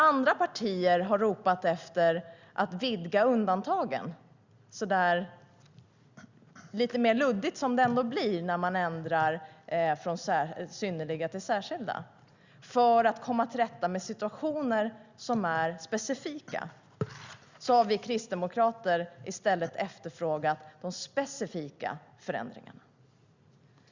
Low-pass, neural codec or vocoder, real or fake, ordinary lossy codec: 7.2 kHz; none; real; Opus, 64 kbps